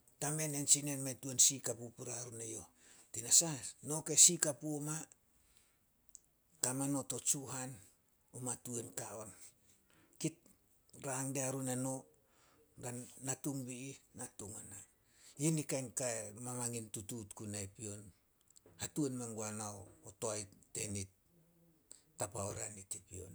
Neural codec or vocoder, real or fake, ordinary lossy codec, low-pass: none; real; none; none